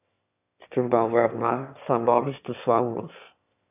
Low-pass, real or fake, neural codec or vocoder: 3.6 kHz; fake; autoencoder, 22.05 kHz, a latent of 192 numbers a frame, VITS, trained on one speaker